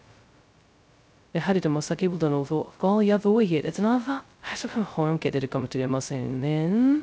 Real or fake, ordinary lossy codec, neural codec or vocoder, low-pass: fake; none; codec, 16 kHz, 0.2 kbps, FocalCodec; none